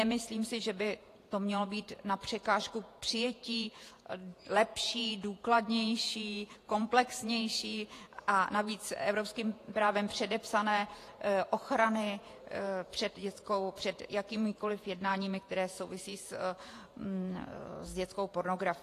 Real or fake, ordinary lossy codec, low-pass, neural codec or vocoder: fake; AAC, 48 kbps; 14.4 kHz; vocoder, 48 kHz, 128 mel bands, Vocos